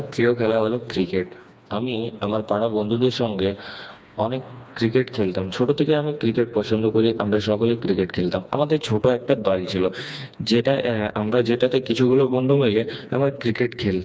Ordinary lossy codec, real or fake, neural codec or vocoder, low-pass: none; fake; codec, 16 kHz, 2 kbps, FreqCodec, smaller model; none